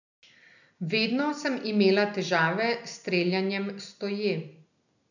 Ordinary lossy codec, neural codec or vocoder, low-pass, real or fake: none; none; 7.2 kHz; real